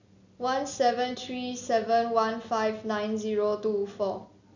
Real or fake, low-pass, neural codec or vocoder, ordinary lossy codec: real; 7.2 kHz; none; none